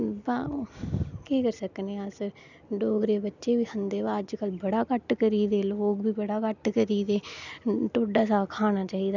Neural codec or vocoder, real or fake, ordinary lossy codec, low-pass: none; real; none; 7.2 kHz